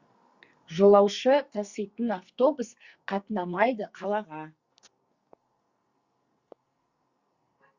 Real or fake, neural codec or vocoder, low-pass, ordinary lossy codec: fake; codec, 44.1 kHz, 2.6 kbps, SNAC; 7.2 kHz; Opus, 64 kbps